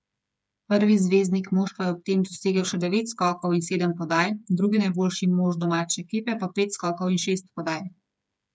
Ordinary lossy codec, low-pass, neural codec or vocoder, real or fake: none; none; codec, 16 kHz, 8 kbps, FreqCodec, smaller model; fake